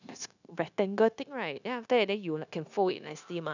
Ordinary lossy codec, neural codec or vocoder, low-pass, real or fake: none; codec, 16 kHz, 0.9 kbps, LongCat-Audio-Codec; 7.2 kHz; fake